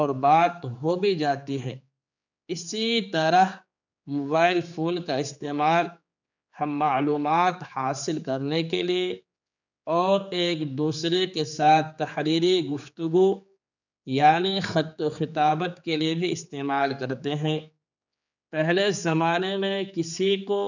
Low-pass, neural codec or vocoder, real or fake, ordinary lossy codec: 7.2 kHz; codec, 16 kHz, 4 kbps, X-Codec, HuBERT features, trained on general audio; fake; none